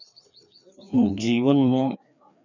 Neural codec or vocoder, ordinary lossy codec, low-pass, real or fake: codec, 16 kHz, 2 kbps, FreqCodec, larger model; none; 7.2 kHz; fake